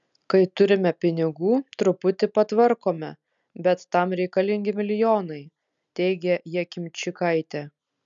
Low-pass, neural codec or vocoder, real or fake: 7.2 kHz; none; real